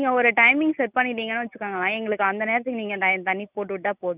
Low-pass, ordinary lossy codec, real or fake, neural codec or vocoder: 3.6 kHz; none; real; none